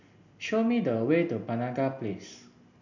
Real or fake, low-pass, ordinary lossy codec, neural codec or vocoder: real; 7.2 kHz; none; none